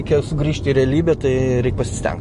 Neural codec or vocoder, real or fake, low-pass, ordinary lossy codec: none; real; 10.8 kHz; MP3, 48 kbps